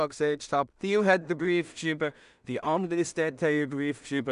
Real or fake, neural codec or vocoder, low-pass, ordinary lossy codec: fake; codec, 16 kHz in and 24 kHz out, 0.4 kbps, LongCat-Audio-Codec, two codebook decoder; 10.8 kHz; none